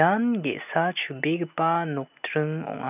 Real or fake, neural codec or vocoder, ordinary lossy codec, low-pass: real; none; none; 3.6 kHz